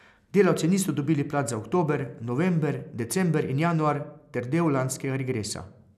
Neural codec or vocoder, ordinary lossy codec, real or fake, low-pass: none; none; real; 14.4 kHz